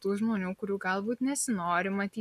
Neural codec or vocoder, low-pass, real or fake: none; 14.4 kHz; real